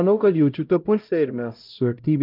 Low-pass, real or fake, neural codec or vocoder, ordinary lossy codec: 5.4 kHz; fake; codec, 16 kHz, 0.5 kbps, X-Codec, HuBERT features, trained on LibriSpeech; Opus, 24 kbps